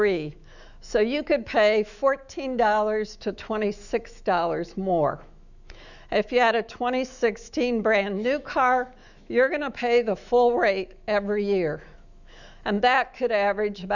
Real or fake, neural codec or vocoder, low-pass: fake; autoencoder, 48 kHz, 128 numbers a frame, DAC-VAE, trained on Japanese speech; 7.2 kHz